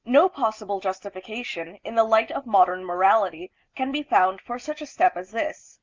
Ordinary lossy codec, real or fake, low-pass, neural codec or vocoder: Opus, 32 kbps; real; 7.2 kHz; none